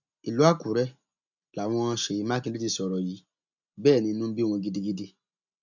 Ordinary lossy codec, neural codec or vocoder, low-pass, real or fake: none; none; 7.2 kHz; real